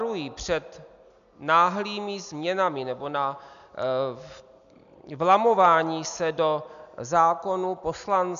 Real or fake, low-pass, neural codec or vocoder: real; 7.2 kHz; none